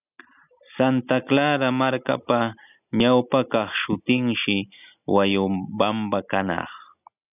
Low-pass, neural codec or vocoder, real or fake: 3.6 kHz; none; real